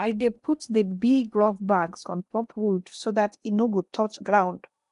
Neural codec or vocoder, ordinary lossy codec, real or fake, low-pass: codec, 16 kHz in and 24 kHz out, 0.8 kbps, FocalCodec, streaming, 65536 codes; none; fake; 10.8 kHz